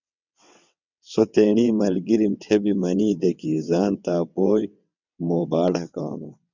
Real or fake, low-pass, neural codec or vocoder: fake; 7.2 kHz; vocoder, 22.05 kHz, 80 mel bands, WaveNeXt